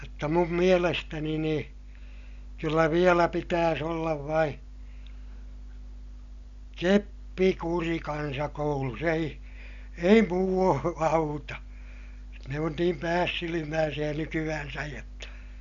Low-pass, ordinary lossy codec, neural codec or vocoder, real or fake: 7.2 kHz; none; none; real